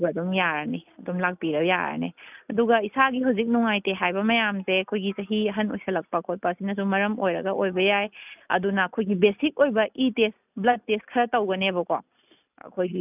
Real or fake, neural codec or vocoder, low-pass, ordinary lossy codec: real; none; 3.6 kHz; none